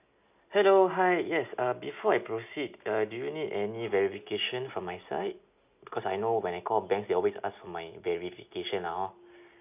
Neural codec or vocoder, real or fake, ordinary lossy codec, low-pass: none; real; none; 3.6 kHz